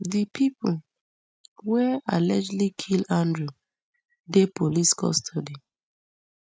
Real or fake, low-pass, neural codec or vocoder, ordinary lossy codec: real; none; none; none